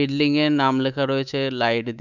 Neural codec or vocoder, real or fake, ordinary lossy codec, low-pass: codec, 24 kHz, 3.1 kbps, DualCodec; fake; none; 7.2 kHz